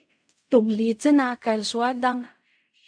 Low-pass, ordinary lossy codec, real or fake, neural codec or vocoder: 9.9 kHz; AAC, 64 kbps; fake; codec, 16 kHz in and 24 kHz out, 0.4 kbps, LongCat-Audio-Codec, fine tuned four codebook decoder